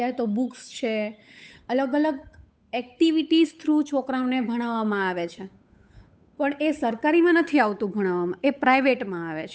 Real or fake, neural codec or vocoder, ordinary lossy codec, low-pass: fake; codec, 16 kHz, 8 kbps, FunCodec, trained on Chinese and English, 25 frames a second; none; none